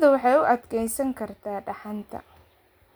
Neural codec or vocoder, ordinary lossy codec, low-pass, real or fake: none; none; none; real